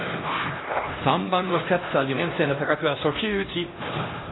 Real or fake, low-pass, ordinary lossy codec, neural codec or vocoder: fake; 7.2 kHz; AAC, 16 kbps; codec, 16 kHz, 1 kbps, X-Codec, HuBERT features, trained on LibriSpeech